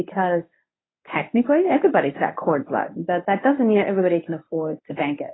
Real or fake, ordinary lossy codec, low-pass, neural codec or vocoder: fake; AAC, 16 kbps; 7.2 kHz; codec, 24 kHz, 0.9 kbps, WavTokenizer, medium speech release version 1